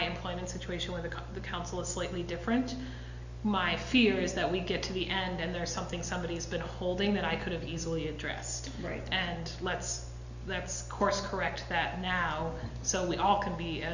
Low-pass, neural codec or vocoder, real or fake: 7.2 kHz; none; real